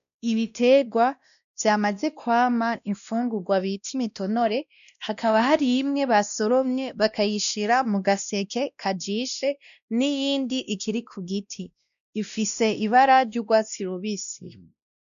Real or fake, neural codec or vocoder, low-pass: fake; codec, 16 kHz, 1 kbps, X-Codec, WavLM features, trained on Multilingual LibriSpeech; 7.2 kHz